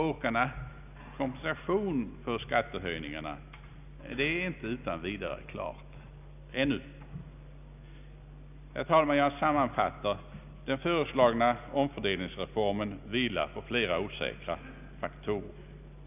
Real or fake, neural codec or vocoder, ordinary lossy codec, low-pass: real; none; none; 3.6 kHz